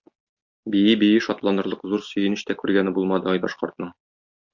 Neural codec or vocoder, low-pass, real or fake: none; 7.2 kHz; real